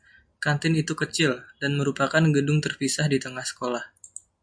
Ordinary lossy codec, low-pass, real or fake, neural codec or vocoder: MP3, 64 kbps; 9.9 kHz; real; none